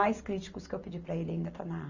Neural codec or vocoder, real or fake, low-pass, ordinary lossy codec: none; real; 7.2 kHz; none